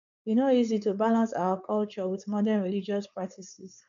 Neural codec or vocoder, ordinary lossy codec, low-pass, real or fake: codec, 16 kHz, 4.8 kbps, FACodec; none; 7.2 kHz; fake